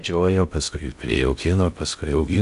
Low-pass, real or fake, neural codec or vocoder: 10.8 kHz; fake; codec, 16 kHz in and 24 kHz out, 0.6 kbps, FocalCodec, streaming, 2048 codes